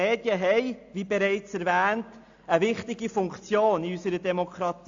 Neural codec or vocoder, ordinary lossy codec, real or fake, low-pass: none; AAC, 64 kbps; real; 7.2 kHz